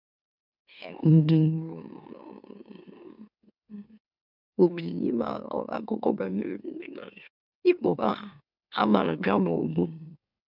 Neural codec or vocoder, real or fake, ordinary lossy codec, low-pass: autoencoder, 44.1 kHz, a latent of 192 numbers a frame, MeloTTS; fake; none; 5.4 kHz